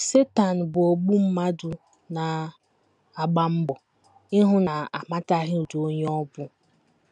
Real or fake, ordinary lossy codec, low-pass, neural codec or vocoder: real; none; 10.8 kHz; none